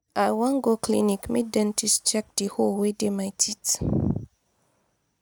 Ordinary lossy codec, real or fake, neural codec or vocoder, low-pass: none; real; none; none